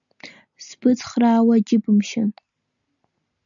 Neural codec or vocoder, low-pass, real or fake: none; 7.2 kHz; real